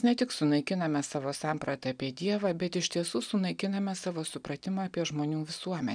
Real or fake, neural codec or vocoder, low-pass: real; none; 9.9 kHz